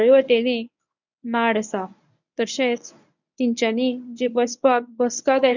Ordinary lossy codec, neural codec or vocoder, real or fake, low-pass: none; codec, 24 kHz, 0.9 kbps, WavTokenizer, medium speech release version 1; fake; 7.2 kHz